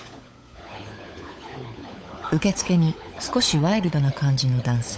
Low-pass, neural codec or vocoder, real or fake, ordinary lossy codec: none; codec, 16 kHz, 16 kbps, FunCodec, trained on LibriTTS, 50 frames a second; fake; none